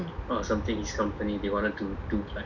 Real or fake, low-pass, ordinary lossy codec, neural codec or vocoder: real; 7.2 kHz; none; none